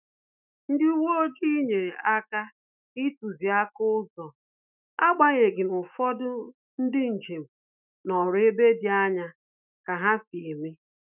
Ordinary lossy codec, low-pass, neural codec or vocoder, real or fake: none; 3.6 kHz; autoencoder, 48 kHz, 128 numbers a frame, DAC-VAE, trained on Japanese speech; fake